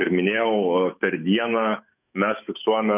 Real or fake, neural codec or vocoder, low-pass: fake; autoencoder, 48 kHz, 128 numbers a frame, DAC-VAE, trained on Japanese speech; 3.6 kHz